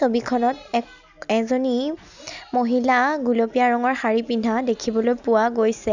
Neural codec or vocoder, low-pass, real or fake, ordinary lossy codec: none; 7.2 kHz; real; none